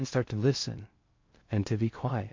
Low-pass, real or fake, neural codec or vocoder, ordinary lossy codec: 7.2 kHz; fake; codec, 16 kHz in and 24 kHz out, 0.6 kbps, FocalCodec, streaming, 2048 codes; MP3, 48 kbps